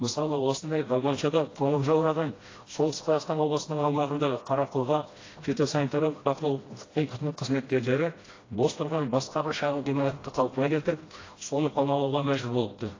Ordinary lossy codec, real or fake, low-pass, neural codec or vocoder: AAC, 32 kbps; fake; 7.2 kHz; codec, 16 kHz, 1 kbps, FreqCodec, smaller model